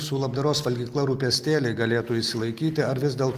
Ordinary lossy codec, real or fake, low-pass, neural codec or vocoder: Opus, 24 kbps; real; 14.4 kHz; none